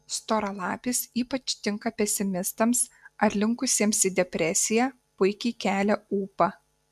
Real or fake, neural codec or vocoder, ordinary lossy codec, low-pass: real; none; MP3, 96 kbps; 14.4 kHz